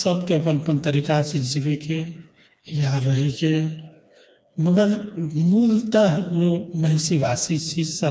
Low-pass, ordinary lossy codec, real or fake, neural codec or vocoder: none; none; fake; codec, 16 kHz, 2 kbps, FreqCodec, smaller model